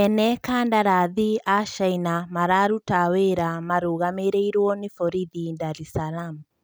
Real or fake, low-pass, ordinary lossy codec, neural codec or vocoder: real; none; none; none